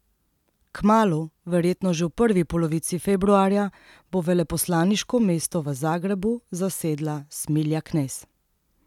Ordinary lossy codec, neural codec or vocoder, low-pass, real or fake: none; none; 19.8 kHz; real